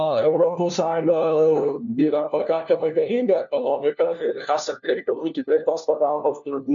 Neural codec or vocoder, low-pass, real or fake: codec, 16 kHz, 1 kbps, FunCodec, trained on LibriTTS, 50 frames a second; 7.2 kHz; fake